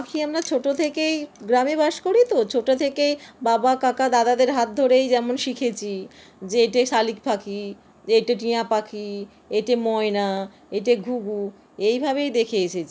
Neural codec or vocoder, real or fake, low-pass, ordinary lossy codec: none; real; none; none